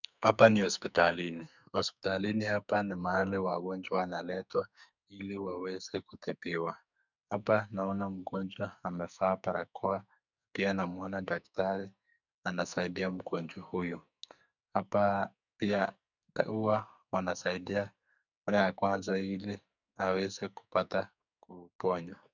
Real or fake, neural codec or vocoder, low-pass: fake; codec, 44.1 kHz, 2.6 kbps, SNAC; 7.2 kHz